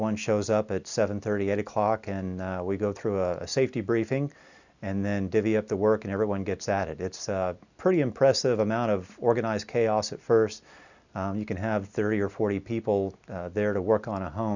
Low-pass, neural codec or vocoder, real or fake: 7.2 kHz; none; real